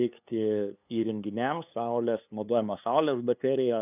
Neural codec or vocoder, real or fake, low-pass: codec, 16 kHz, 2 kbps, FunCodec, trained on LibriTTS, 25 frames a second; fake; 3.6 kHz